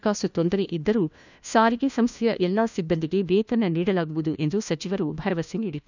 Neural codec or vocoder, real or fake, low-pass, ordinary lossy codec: codec, 16 kHz, 1 kbps, FunCodec, trained on LibriTTS, 50 frames a second; fake; 7.2 kHz; none